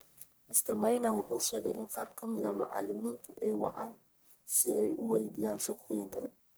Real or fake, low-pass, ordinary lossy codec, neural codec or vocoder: fake; none; none; codec, 44.1 kHz, 1.7 kbps, Pupu-Codec